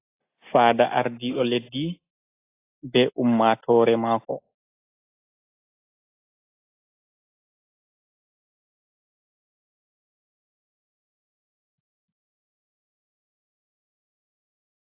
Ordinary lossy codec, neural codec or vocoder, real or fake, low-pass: AAC, 24 kbps; none; real; 3.6 kHz